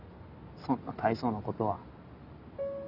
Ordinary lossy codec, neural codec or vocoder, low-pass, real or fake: none; none; 5.4 kHz; real